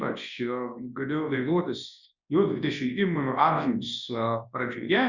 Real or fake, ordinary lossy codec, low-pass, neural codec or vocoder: fake; Opus, 64 kbps; 7.2 kHz; codec, 24 kHz, 0.9 kbps, WavTokenizer, large speech release